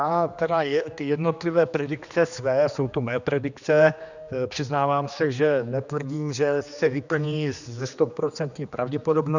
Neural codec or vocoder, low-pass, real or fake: codec, 16 kHz, 2 kbps, X-Codec, HuBERT features, trained on general audio; 7.2 kHz; fake